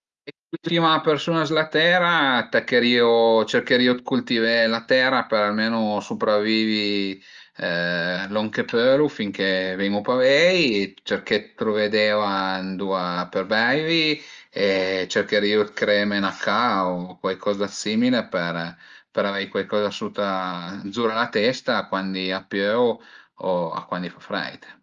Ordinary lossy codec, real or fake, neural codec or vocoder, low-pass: Opus, 32 kbps; real; none; 7.2 kHz